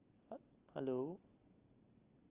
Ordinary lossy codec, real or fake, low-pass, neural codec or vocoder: Opus, 32 kbps; real; 3.6 kHz; none